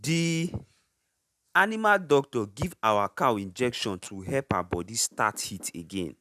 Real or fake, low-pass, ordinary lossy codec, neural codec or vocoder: real; 14.4 kHz; none; none